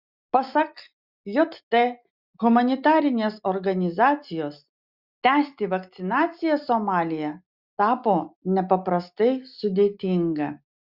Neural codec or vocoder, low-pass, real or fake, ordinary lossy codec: none; 5.4 kHz; real; Opus, 64 kbps